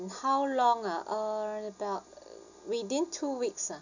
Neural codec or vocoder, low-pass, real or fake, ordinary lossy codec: none; 7.2 kHz; real; none